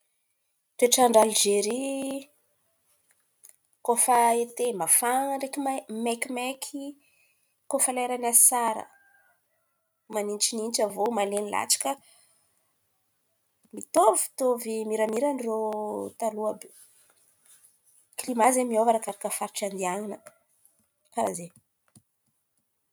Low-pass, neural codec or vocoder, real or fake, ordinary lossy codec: none; none; real; none